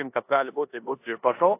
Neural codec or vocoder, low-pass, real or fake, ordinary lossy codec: codec, 16 kHz in and 24 kHz out, 0.9 kbps, LongCat-Audio-Codec, four codebook decoder; 3.6 kHz; fake; AAC, 24 kbps